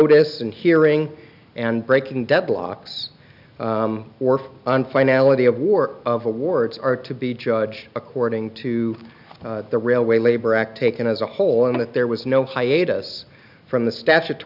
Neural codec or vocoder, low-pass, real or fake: none; 5.4 kHz; real